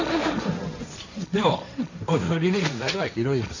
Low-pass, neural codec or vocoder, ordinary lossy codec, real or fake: 7.2 kHz; codec, 16 kHz, 1.1 kbps, Voila-Tokenizer; none; fake